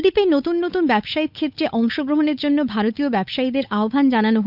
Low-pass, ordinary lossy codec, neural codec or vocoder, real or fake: 5.4 kHz; none; codec, 16 kHz, 8 kbps, FunCodec, trained on Chinese and English, 25 frames a second; fake